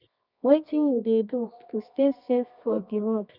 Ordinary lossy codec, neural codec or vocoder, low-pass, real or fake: none; codec, 24 kHz, 0.9 kbps, WavTokenizer, medium music audio release; 5.4 kHz; fake